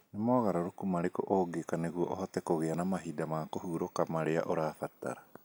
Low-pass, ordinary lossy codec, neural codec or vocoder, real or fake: none; none; none; real